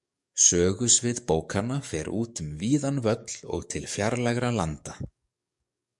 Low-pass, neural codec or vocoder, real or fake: 10.8 kHz; codec, 44.1 kHz, 7.8 kbps, DAC; fake